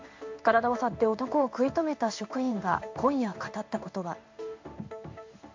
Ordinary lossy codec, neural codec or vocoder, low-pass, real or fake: MP3, 48 kbps; codec, 16 kHz in and 24 kHz out, 1 kbps, XY-Tokenizer; 7.2 kHz; fake